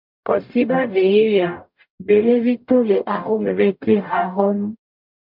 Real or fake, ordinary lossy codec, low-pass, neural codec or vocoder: fake; MP3, 48 kbps; 5.4 kHz; codec, 44.1 kHz, 0.9 kbps, DAC